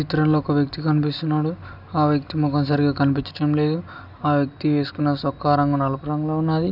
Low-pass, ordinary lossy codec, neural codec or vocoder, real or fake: 5.4 kHz; none; none; real